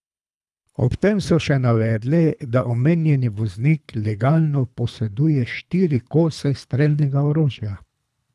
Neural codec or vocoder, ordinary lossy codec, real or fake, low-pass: codec, 24 kHz, 3 kbps, HILCodec; none; fake; none